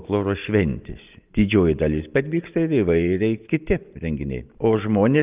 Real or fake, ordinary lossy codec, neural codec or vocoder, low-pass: real; Opus, 24 kbps; none; 3.6 kHz